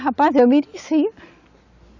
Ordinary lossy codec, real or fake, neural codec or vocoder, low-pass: none; real; none; 7.2 kHz